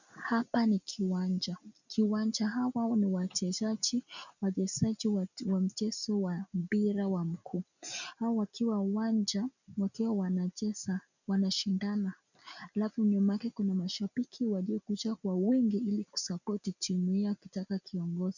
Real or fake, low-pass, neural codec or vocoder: real; 7.2 kHz; none